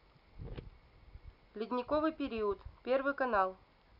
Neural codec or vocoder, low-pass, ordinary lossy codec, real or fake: none; 5.4 kHz; none; real